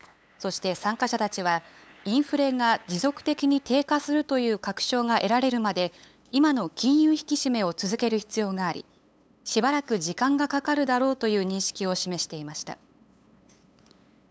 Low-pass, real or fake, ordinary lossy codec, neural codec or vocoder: none; fake; none; codec, 16 kHz, 8 kbps, FunCodec, trained on LibriTTS, 25 frames a second